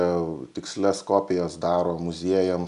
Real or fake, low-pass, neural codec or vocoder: real; 10.8 kHz; none